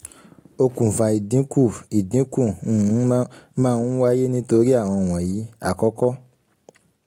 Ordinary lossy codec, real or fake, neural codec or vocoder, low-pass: AAC, 48 kbps; real; none; 19.8 kHz